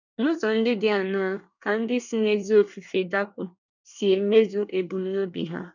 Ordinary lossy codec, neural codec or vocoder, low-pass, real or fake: none; codec, 24 kHz, 1 kbps, SNAC; 7.2 kHz; fake